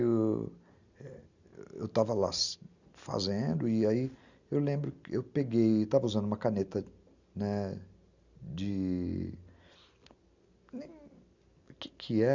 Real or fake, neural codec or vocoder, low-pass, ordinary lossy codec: real; none; 7.2 kHz; Opus, 64 kbps